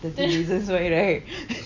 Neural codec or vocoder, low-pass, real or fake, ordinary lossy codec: none; 7.2 kHz; real; none